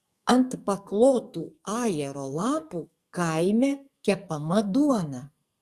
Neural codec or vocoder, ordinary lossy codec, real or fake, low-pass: codec, 44.1 kHz, 2.6 kbps, SNAC; Opus, 64 kbps; fake; 14.4 kHz